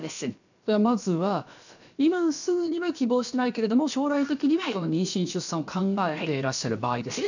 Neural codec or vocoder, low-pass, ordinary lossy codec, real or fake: codec, 16 kHz, about 1 kbps, DyCAST, with the encoder's durations; 7.2 kHz; none; fake